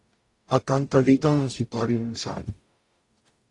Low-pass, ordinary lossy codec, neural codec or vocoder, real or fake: 10.8 kHz; AAC, 48 kbps; codec, 44.1 kHz, 0.9 kbps, DAC; fake